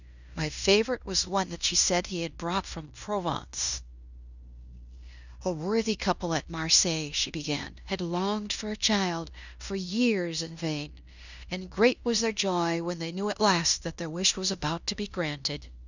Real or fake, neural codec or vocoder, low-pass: fake; codec, 16 kHz in and 24 kHz out, 0.9 kbps, LongCat-Audio-Codec, fine tuned four codebook decoder; 7.2 kHz